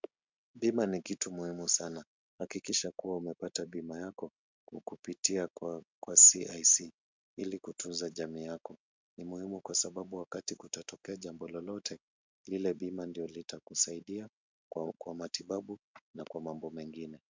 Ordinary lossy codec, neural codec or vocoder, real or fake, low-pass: MP3, 64 kbps; none; real; 7.2 kHz